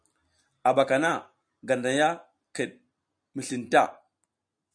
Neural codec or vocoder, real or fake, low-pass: none; real; 9.9 kHz